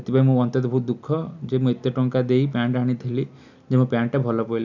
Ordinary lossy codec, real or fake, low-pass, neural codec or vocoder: none; real; 7.2 kHz; none